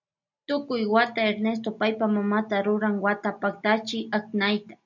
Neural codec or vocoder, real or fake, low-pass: none; real; 7.2 kHz